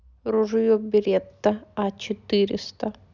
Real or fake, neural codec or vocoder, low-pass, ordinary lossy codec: real; none; 7.2 kHz; none